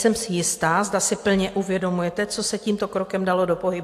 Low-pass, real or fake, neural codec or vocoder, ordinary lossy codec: 14.4 kHz; real; none; AAC, 64 kbps